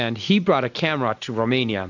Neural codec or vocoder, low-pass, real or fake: none; 7.2 kHz; real